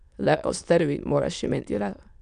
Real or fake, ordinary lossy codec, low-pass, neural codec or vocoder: fake; none; 9.9 kHz; autoencoder, 22.05 kHz, a latent of 192 numbers a frame, VITS, trained on many speakers